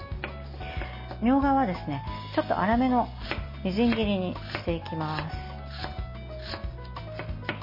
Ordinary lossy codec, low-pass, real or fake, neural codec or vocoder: MP3, 24 kbps; 5.4 kHz; real; none